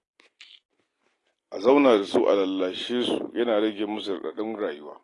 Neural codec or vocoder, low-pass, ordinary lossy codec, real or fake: none; 10.8 kHz; AAC, 32 kbps; real